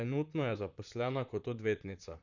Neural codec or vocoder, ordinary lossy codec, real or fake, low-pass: vocoder, 44.1 kHz, 128 mel bands, Pupu-Vocoder; none; fake; 7.2 kHz